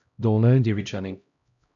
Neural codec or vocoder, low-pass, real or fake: codec, 16 kHz, 0.5 kbps, X-Codec, HuBERT features, trained on LibriSpeech; 7.2 kHz; fake